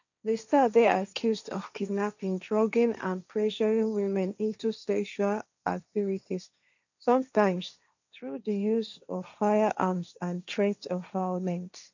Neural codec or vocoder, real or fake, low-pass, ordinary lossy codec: codec, 16 kHz, 1.1 kbps, Voila-Tokenizer; fake; 7.2 kHz; none